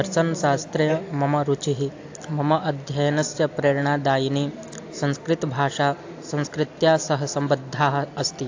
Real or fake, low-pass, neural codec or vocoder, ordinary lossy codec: real; 7.2 kHz; none; AAC, 48 kbps